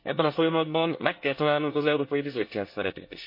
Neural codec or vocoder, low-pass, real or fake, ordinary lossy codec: codec, 24 kHz, 1 kbps, SNAC; 5.4 kHz; fake; MP3, 32 kbps